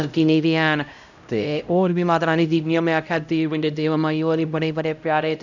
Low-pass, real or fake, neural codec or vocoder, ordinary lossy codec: 7.2 kHz; fake; codec, 16 kHz, 0.5 kbps, X-Codec, HuBERT features, trained on LibriSpeech; none